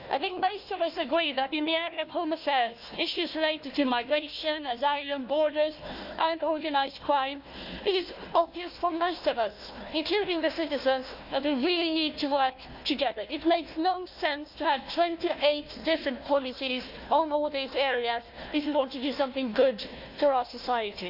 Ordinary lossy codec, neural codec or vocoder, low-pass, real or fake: Opus, 64 kbps; codec, 16 kHz, 1 kbps, FunCodec, trained on Chinese and English, 50 frames a second; 5.4 kHz; fake